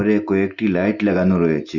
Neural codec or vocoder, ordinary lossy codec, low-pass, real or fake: none; Opus, 64 kbps; 7.2 kHz; real